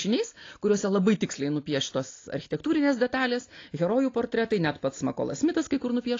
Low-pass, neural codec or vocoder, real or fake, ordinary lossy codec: 7.2 kHz; none; real; AAC, 32 kbps